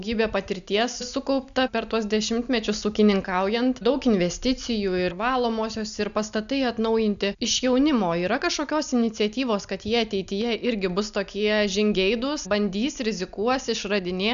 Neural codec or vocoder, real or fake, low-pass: none; real; 7.2 kHz